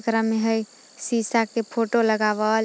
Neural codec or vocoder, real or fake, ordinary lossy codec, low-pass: none; real; none; none